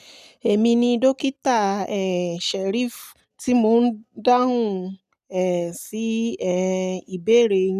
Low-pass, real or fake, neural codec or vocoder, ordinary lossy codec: 14.4 kHz; real; none; none